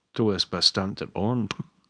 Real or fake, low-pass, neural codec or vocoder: fake; 9.9 kHz; codec, 24 kHz, 0.9 kbps, WavTokenizer, small release